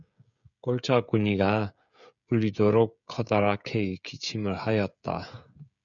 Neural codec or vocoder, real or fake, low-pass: codec, 16 kHz, 16 kbps, FreqCodec, smaller model; fake; 7.2 kHz